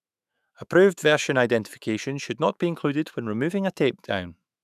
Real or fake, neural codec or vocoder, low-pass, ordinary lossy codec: fake; autoencoder, 48 kHz, 128 numbers a frame, DAC-VAE, trained on Japanese speech; 14.4 kHz; none